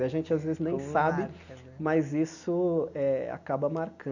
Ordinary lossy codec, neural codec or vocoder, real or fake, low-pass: none; none; real; 7.2 kHz